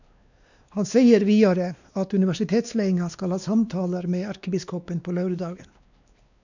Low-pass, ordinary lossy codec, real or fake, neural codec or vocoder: 7.2 kHz; none; fake; codec, 16 kHz, 2 kbps, X-Codec, WavLM features, trained on Multilingual LibriSpeech